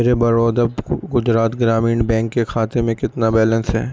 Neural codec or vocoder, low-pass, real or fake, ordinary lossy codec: none; none; real; none